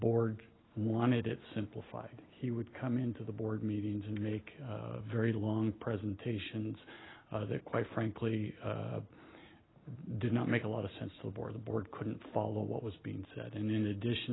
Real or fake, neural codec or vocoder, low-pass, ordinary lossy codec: real; none; 7.2 kHz; AAC, 16 kbps